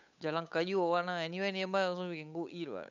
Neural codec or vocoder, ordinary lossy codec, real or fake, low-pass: codec, 16 kHz, 8 kbps, FunCodec, trained on Chinese and English, 25 frames a second; none; fake; 7.2 kHz